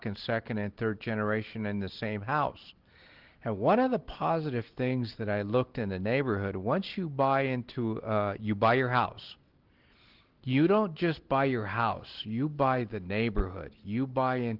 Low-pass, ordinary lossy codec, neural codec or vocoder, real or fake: 5.4 kHz; Opus, 16 kbps; none; real